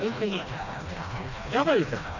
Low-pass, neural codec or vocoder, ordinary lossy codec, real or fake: 7.2 kHz; codec, 16 kHz, 1 kbps, FreqCodec, smaller model; none; fake